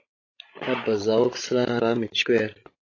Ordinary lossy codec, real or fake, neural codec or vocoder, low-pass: AAC, 32 kbps; fake; codec, 16 kHz, 16 kbps, FreqCodec, larger model; 7.2 kHz